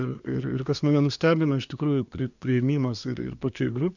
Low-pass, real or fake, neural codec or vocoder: 7.2 kHz; fake; codec, 24 kHz, 1 kbps, SNAC